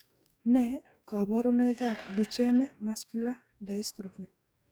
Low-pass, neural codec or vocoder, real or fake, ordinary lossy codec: none; codec, 44.1 kHz, 2.6 kbps, DAC; fake; none